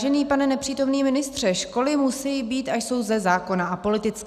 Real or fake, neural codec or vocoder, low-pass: real; none; 14.4 kHz